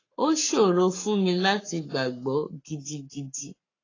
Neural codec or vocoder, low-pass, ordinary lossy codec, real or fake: codec, 44.1 kHz, 7.8 kbps, Pupu-Codec; 7.2 kHz; AAC, 32 kbps; fake